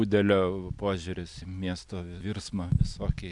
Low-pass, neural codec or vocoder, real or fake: 10.8 kHz; none; real